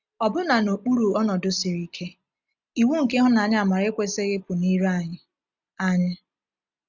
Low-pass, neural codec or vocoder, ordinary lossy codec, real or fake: 7.2 kHz; none; none; real